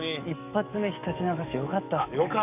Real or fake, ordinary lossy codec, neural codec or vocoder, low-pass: fake; none; codec, 44.1 kHz, 7.8 kbps, DAC; 3.6 kHz